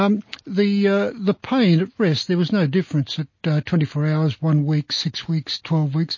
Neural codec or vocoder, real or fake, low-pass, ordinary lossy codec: none; real; 7.2 kHz; MP3, 32 kbps